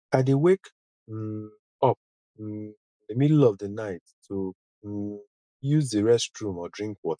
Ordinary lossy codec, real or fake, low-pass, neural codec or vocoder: MP3, 96 kbps; real; 9.9 kHz; none